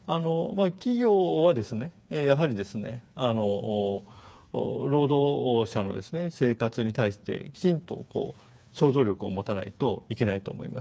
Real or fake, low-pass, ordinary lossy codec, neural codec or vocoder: fake; none; none; codec, 16 kHz, 4 kbps, FreqCodec, smaller model